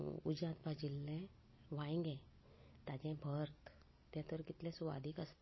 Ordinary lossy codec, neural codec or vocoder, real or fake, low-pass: MP3, 24 kbps; none; real; 7.2 kHz